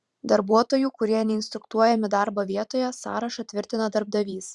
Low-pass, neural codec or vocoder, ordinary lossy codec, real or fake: 10.8 kHz; vocoder, 44.1 kHz, 128 mel bands every 512 samples, BigVGAN v2; Opus, 64 kbps; fake